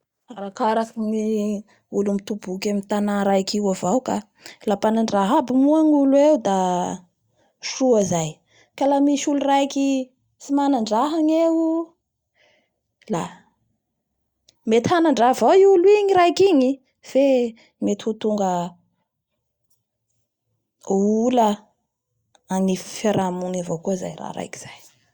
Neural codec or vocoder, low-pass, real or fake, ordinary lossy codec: none; 19.8 kHz; real; Opus, 64 kbps